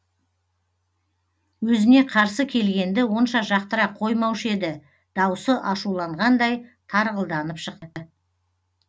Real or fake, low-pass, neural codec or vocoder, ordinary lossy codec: real; none; none; none